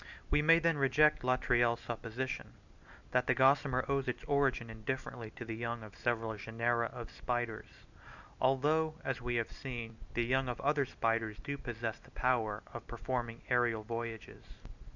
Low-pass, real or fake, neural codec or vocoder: 7.2 kHz; real; none